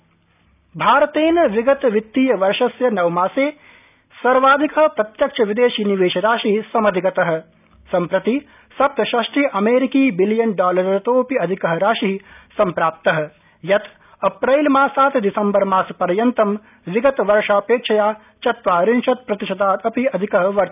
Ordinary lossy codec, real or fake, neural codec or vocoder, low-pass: none; real; none; 3.6 kHz